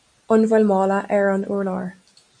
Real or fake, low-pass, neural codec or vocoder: real; 9.9 kHz; none